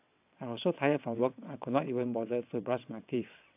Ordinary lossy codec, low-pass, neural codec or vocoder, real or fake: AAC, 32 kbps; 3.6 kHz; vocoder, 22.05 kHz, 80 mel bands, WaveNeXt; fake